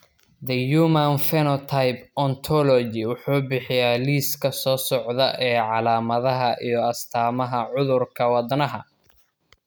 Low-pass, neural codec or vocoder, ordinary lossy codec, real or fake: none; none; none; real